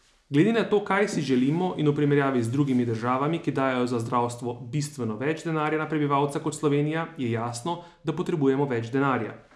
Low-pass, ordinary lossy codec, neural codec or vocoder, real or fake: none; none; none; real